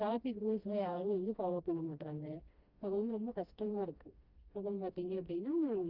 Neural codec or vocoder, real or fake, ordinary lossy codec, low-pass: codec, 16 kHz, 1 kbps, FreqCodec, smaller model; fake; Opus, 32 kbps; 5.4 kHz